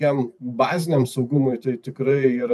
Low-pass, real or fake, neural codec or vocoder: 14.4 kHz; fake; vocoder, 44.1 kHz, 128 mel bands every 512 samples, BigVGAN v2